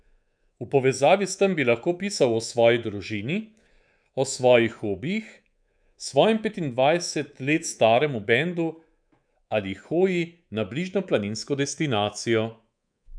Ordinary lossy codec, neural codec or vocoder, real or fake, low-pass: none; codec, 24 kHz, 3.1 kbps, DualCodec; fake; 9.9 kHz